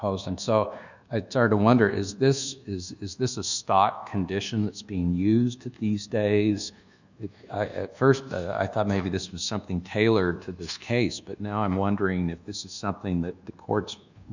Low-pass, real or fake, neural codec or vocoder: 7.2 kHz; fake; codec, 24 kHz, 1.2 kbps, DualCodec